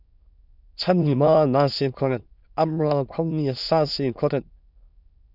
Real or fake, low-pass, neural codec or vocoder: fake; 5.4 kHz; autoencoder, 22.05 kHz, a latent of 192 numbers a frame, VITS, trained on many speakers